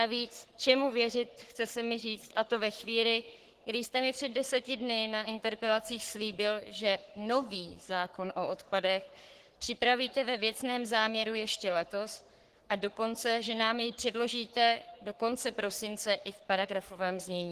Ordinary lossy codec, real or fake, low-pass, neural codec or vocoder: Opus, 16 kbps; fake; 14.4 kHz; codec, 44.1 kHz, 3.4 kbps, Pupu-Codec